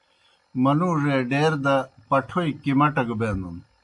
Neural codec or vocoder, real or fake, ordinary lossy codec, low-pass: none; real; AAC, 64 kbps; 10.8 kHz